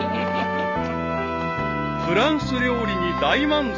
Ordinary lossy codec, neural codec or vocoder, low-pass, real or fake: none; none; 7.2 kHz; real